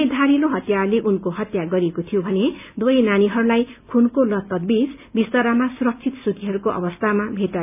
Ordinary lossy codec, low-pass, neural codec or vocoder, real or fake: none; 3.6 kHz; none; real